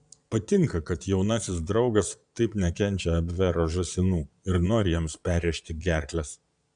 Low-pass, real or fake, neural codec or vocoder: 9.9 kHz; fake; vocoder, 22.05 kHz, 80 mel bands, Vocos